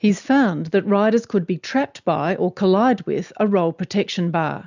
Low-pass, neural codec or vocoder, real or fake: 7.2 kHz; none; real